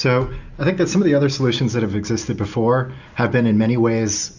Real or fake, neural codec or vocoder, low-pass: real; none; 7.2 kHz